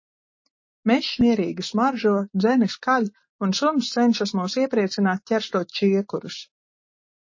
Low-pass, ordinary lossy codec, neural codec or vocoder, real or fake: 7.2 kHz; MP3, 32 kbps; none; real